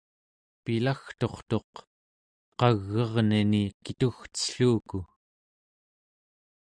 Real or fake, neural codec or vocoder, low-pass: real; none; 9.9 kHz